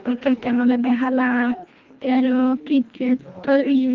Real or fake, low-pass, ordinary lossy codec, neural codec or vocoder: fake; 7.2 kHz; Opus, 32 kbps; codec, 24 kHz, 1.5 kbps, HILCodec